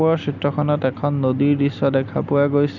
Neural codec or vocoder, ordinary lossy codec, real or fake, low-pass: none; none; real; 7.2 kHz